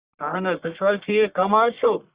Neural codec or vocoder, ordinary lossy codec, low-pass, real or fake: codec, 44.1 kHz, 1.7 kbps, Pupu-Codec; Opus, 64 kbps; 3.6 kHz; fake